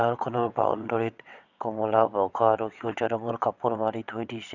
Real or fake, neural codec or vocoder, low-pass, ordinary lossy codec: fake; vocoder, 22.05 kHz, 80 mel bands, WaveNeXt; 7.2 kHz; none